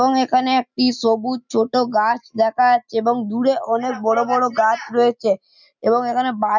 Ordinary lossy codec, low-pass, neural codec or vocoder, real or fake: none; 7.2 kHz; none; real